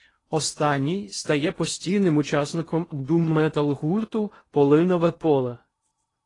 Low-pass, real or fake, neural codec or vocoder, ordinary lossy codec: 10.8 kHz; fake; codec, 16 kHz in and 24 kHz out, 0.8 kbps, FocalCodec, streaming, 65536 codes; AAC, 32 kbps